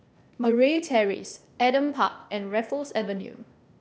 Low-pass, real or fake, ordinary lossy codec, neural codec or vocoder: none; fake; none; codec, 16 kHz, 0.8 kbps, ZipCodec